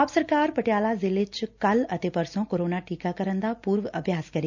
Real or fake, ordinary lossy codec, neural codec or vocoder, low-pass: real; none; none; 7.2 kHz